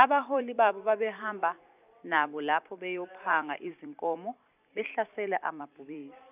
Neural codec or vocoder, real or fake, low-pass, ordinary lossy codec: vocoder, 44.1 kHz, 128 mel bands every 256 samples, BigVGAN v2; fake; 3.6 kHz; none